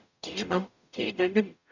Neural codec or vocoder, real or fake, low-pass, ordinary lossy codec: codec, 44.1 kHz, 0.9 kbps, DAC; fake; 7.2 kHz; none